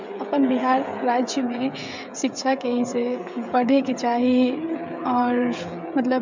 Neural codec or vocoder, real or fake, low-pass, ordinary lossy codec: codec, 16 kHz, 16 kbps, FreqCodec, smaller model; fake; 7.2 kHz; MP3, 64 kbps